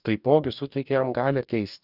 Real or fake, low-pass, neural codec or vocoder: fake; 5.4 kHz; codec, 44.1 kHz, 2.6 kbps, DAC